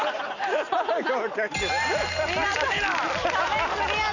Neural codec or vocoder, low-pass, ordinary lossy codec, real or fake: none; 7.2 kHz; none; real